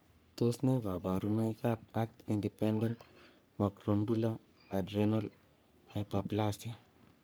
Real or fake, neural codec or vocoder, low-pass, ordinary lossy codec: fake; codec, 44.1 kHz, 3.4 kbps, Pupu-Codec; none; none